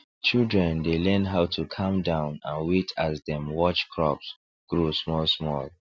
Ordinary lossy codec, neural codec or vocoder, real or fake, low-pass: none; none; real; none